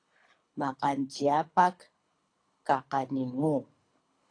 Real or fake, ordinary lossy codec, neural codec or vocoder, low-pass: fake; AAC, 48 kbps; codec, 24 kHz, 3 kbps, HILCodec; 9.9 kHz